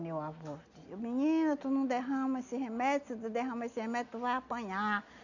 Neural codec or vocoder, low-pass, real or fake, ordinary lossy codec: none; 7.2 kHz; real; none